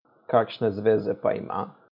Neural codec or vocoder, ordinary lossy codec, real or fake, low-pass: none; none; real; 5.4 kHz